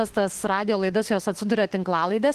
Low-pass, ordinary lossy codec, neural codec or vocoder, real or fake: 14.4 kHz; Opus, 16 kbps; autoencoder, 48 kHz, 32 numbers a frame, DAC-VAE, trained on Japanese speech; fake